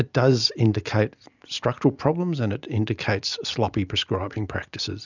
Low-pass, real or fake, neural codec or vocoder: 7.2 kHz; real; none